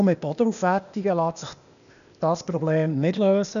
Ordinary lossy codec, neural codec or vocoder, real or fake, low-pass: none; codec, 16 kHz, 0.8 kbps, ZipCodec; fake; 7.2 kHz